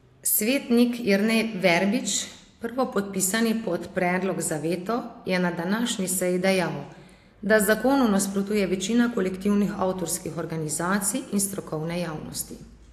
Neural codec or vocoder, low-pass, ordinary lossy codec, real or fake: none; 14.4 kHz; AAC, 64 kbps; real